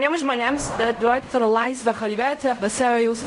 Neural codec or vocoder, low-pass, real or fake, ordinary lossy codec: codec, 16 kHz in and 24 kHz out, 0.4 kbps, LongCat-Audio-Codec, fine tuned four codebook decoder; 10.8 kHz; fake; MP3, 64 kbps